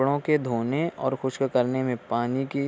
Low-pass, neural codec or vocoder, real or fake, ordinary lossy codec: none; none; real; none